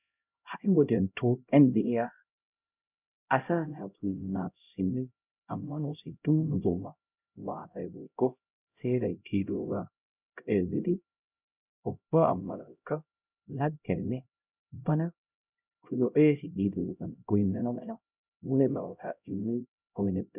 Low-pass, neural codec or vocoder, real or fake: 3.6 kHz; codec, 16 kHz, 0.5 kbps, X-Codec, HuBERT features, trained on LibriSpeech; fake